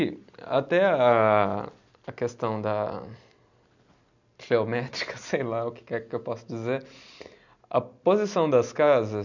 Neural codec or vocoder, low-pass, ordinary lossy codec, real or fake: none; 7.2 kHz; none; real